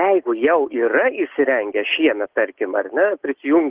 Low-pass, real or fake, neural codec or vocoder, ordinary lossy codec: 3.6 kHz; real; none; Opus, 16 kbps